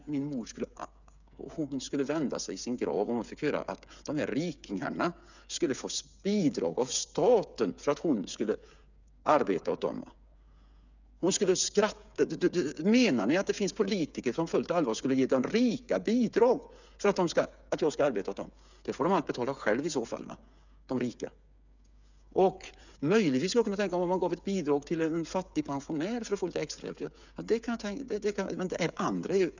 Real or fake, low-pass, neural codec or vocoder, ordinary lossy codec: fake; 7.2 kHz; codec, 16 kHz, 8 kbps, FreqCodec, smaller model; none